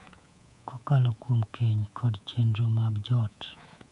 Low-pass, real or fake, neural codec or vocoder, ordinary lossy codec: 10.8 kHz; fake; codec, 24 kHz, 3.1 kbps, DualCodec; none